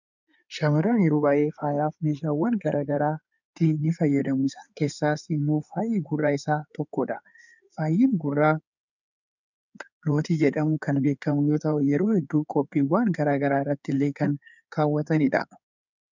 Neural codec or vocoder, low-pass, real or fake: codec, 16 kHz in and 24 kHz out, 2.2 kbps, FireRedTTS-2 codec; 7.2 kHz; fake